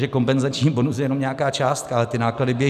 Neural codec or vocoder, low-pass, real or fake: none; 14.4 kHz; real